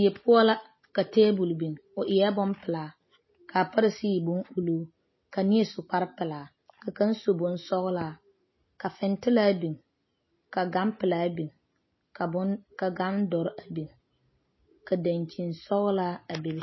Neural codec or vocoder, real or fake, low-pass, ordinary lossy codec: none; real; 7.2 kHz; MP3, 24 kbps